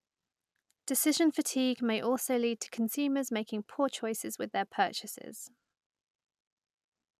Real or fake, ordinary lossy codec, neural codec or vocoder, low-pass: real; none; none; 14.4 kHz